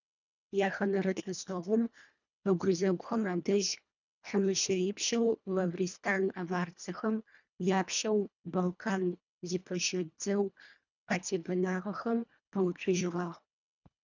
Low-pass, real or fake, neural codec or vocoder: 7.2 kHz; fake; codec, 24 kHz, 1.5 kbps, HILCodec